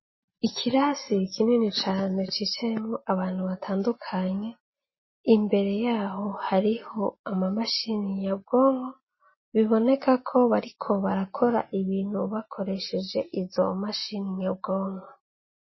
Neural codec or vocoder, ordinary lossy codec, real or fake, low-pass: none; MP3, 24 kbps; real; 7.2 kHz